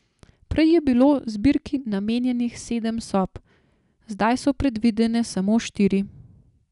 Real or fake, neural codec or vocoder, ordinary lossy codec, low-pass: real; none; none; 10.8 kHz